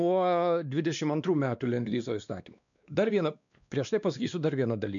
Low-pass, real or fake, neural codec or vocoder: 7.2 kHz; fake; codec, 16 kHz, 2 kbps, X-Codec, WavLM features, trained on Multilingual LibriSpeech